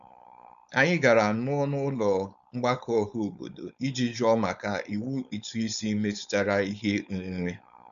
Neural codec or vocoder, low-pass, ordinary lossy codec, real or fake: codec, 16 kHz, 4.8 kbps, FACodec; 7.2 kHz; none; fake